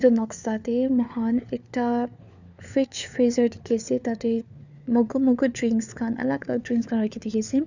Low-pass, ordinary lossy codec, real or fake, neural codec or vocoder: 7.2 kHz; none; fake; codec, 16 kHz, 4 kbps, FunCodec, trained on LibriTTS, 50 frames a second